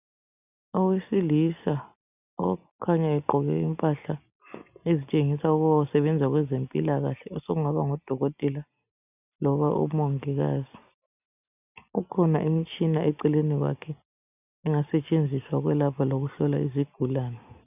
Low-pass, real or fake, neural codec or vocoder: 3.6 kHz; real; none